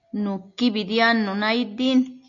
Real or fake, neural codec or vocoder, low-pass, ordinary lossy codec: real; none; 7.2 kHz; AAC, 48 kbps